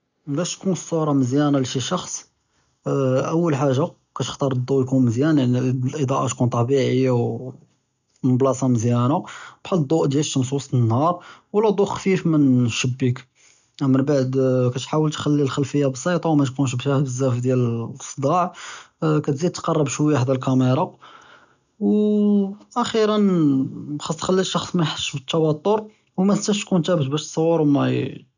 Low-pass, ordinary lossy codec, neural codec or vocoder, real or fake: 7.2 kHz; none; none; real